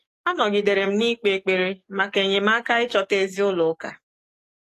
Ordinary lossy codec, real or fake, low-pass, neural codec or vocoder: AAC, 48 kbps; fake; 14.4 kHz; codec, 44.1 kHz, 7.8 kbps, DAC